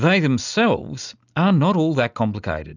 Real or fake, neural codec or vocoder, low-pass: real; none; 7.2 kHz